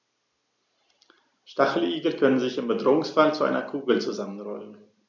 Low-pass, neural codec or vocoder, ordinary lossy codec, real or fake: 7.2 kHz; none; none; real